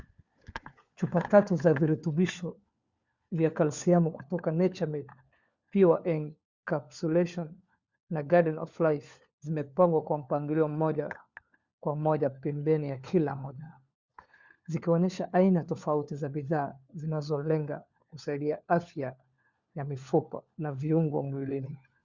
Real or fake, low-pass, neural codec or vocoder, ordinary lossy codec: fake; 7.2 kHz; codec, 16 kHz, 4 kbps, FunCodec, trained on LibriTTS, 50 frames a second; Opus, 64 kbps